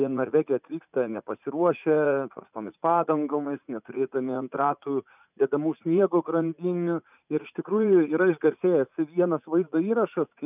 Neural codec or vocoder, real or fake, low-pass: vocoder, 44.1 kHz, 80 mel bands, Vocos; fake; 3.6 kHz